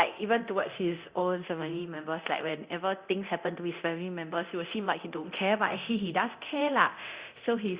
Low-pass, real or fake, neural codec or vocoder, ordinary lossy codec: 3.6 kHz; fake; codec, 24 kHz, 0.9 kbps, DualCodec; Opus, 64 kbps